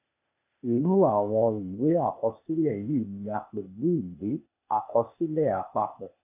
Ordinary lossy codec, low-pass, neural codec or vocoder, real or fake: none; 3.6 kHz; codec, 16 kHz, 0.8 kbps, ZipCodec; fake